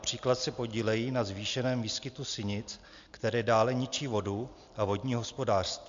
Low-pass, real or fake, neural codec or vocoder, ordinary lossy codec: 7.2 kHz; real; none; AAC, 64 kbps